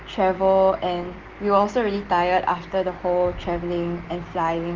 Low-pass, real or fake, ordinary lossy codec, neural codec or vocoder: 7.2 kHz; real; Opus, 16 kbps; none